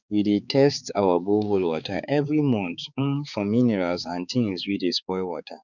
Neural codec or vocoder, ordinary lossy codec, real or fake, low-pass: codec, 16 kHz, 4 kbps, X-Codec, HuBERT features, trained on balanced general audio; none; fake; 7.2 kHz